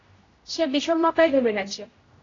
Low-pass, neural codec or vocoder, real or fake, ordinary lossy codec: 7.2 kHz; codec, 16 kHz, 0.5 kbps, X-Codec, HuBERT features, trained on general audio; fake; AAC, 32 kbps